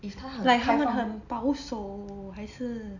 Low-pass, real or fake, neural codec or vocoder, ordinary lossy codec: 7.2 kHz; real; none; none